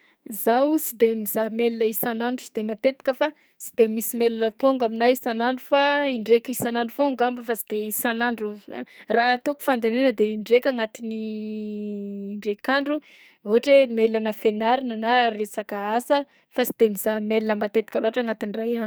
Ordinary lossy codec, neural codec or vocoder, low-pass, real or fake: none; codec, 44.1 kHz, 2.6 kbps, SNAC; none; fake